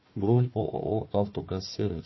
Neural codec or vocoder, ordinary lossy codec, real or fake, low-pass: codec, 16 kHz, 1 kbps, FunCodec, trained on Chinese and English, 50 frames a second; MP3, 24 kbps; fake; 7.2 kHz